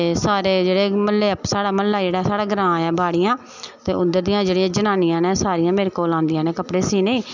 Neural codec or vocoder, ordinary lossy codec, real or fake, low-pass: none; none; real; 7.2 kHz